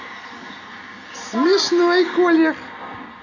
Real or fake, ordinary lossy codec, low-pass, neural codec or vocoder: real; none; 7.2 kHz; none